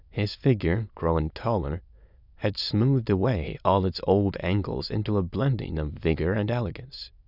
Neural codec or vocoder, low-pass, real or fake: autoencoder, 22.05 kHz, a latent of 192 numbers a frame, VITS, trained on many speakers; 5.4 kHz; fake